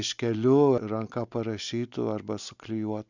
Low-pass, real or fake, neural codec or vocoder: 7.2 kHz; real; none